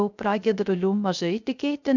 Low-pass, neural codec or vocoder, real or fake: 7.2 kHz; codec, 16 kHz, 0.3 kbps, FocalCodec; fake